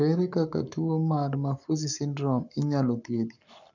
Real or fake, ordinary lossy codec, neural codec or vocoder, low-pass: fake; none; codec, 44.1 kHz, 7.8 kbps, Pupu-Codec; 7.2 kHz